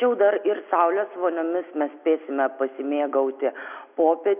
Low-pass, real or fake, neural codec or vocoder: 3.6 kHz; real; none